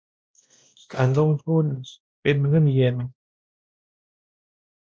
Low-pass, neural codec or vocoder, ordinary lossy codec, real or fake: none; codec, 16 kHz, 1 kbps, X-Codec, WavLM features, trained on Multilingual LibriSpeech; none; fake